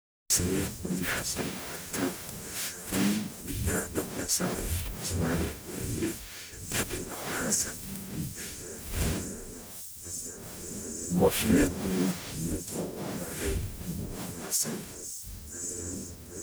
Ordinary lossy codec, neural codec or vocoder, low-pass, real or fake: none; codec, 44.1 kHz, 0.9 kbps, DAC; none; fake